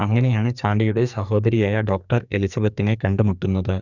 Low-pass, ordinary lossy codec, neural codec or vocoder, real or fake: 7.2 kHz; none; codec, 44.1 kHz, 2.6 kbps, SNAC; fake